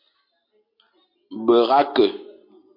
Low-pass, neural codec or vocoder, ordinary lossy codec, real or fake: 5.4 kHz; none; MP3, 32 kbps; real